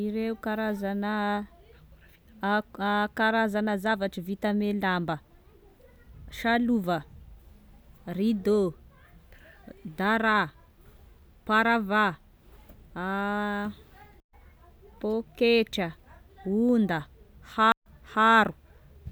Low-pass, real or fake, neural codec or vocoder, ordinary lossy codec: none; real; none; none